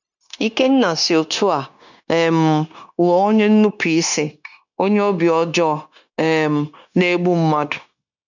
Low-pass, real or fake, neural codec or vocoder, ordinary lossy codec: 7.2 kHz; fake; codec, 16 kHz, 0.9 kbps, LongCat-Audio-Codec; none